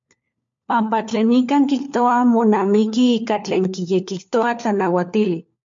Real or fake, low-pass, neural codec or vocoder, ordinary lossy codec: fake; 7.2 kHz; codec, 16 kHz, 4 kbps, FunCodec, trained on LibriTTS, 50 frames a second; MP3, 48 kbps